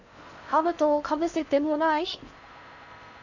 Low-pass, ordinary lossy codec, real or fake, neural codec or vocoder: 7.2 kHz; none; fake; codec, 16 kHz in and 24 kHz out, 0.6 kbps, FocalCodec, streaming, 4096 codes